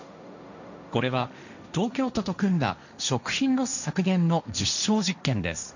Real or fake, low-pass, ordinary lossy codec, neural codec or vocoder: fake; 7.2 kHz; none; codec, 16 kHz, 1.1 kbps, Voila-Tokenizer